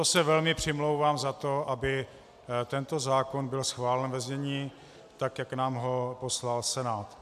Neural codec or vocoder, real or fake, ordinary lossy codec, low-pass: none; real; AAC, 96 kbps; 14.4 kHz